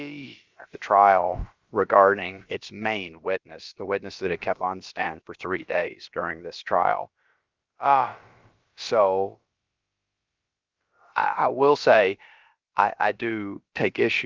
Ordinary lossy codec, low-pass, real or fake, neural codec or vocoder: Opus, 32 kbps; 7.2 kHz; fake; codec, 16 kHz, about 1 kbps, DyCAST, with the encoder's durations